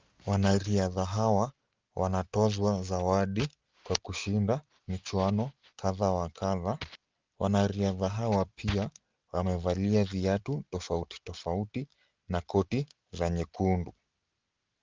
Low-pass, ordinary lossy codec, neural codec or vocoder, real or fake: 7.2 kHz; Opus, 32 kbps; none; real